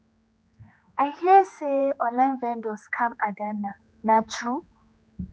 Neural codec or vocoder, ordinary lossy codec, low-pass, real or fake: codec, 16 kHz, 2 kbps, X-Codec, HuBERT features, trained on general audio; none; none; fake